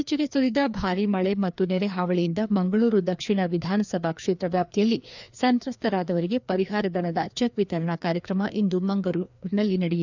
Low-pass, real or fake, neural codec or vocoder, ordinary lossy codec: 7.2 kHz; fake; codec, 16 kHz, 2 kbps, FreqCodec, larger model; none